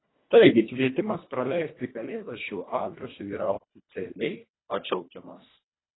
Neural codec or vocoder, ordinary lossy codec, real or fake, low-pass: codec, 24 kHz, 1.5 kbps, HILCodec; AAC, 16 kbps; fake; 7.2 kHz